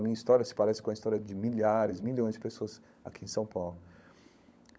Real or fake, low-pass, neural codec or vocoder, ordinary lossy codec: fake; none; codec, 16 kHz, 16 kbps, FunCodec, trained on LibriTTS, 50 frames a second; none